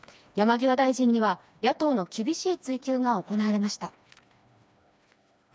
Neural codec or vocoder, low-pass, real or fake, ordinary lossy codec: codec, 16 kHz, 2 kbps, FreqCodec, smaller model; none; fake; none